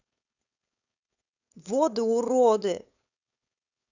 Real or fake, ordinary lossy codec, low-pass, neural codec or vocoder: fake; none; 7.2 kHz; codec, 16 kHz, 4.8 kbps, FACodec